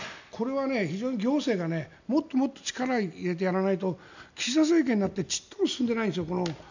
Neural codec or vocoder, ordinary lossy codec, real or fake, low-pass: none; none; real; 7.2 kHz